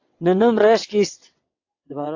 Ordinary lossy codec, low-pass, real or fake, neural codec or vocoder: AAC, 48 kbps; 7.2 kHz; fake; vocoder, 22.05 kHz, 80 mel bands, WaveNeXt